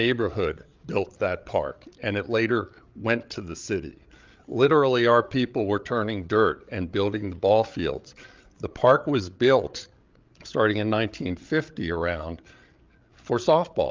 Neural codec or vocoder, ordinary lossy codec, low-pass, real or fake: codec, 16 kHz, 8 kbps, FreqCodec, larger model; Opus, 24 kbps; 7.2 kHz; fake